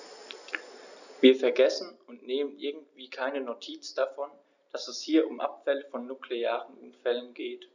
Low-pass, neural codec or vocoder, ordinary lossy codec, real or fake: 7.2 kHz; none; none; real